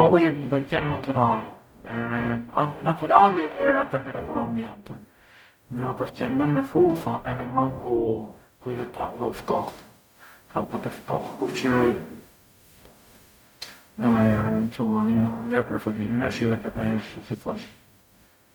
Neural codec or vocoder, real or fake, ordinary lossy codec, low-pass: codec, 44.1 kHz, 0.9 kbps, DAC; fake; none; none